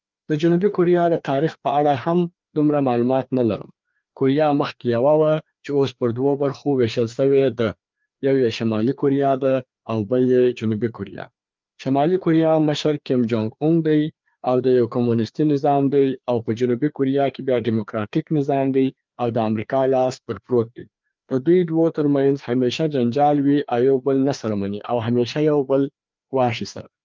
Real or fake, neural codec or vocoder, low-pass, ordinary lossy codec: fake; codec, 16 kHz, 2 kbps, FreqCodec, larger model; 7.2 kHz; Opus, 24 kbps